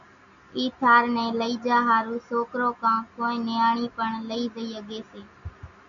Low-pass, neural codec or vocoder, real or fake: 7.2 kHz; none; real